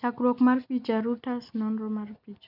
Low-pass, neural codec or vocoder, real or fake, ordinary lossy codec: 5.4 kHz; none; real; none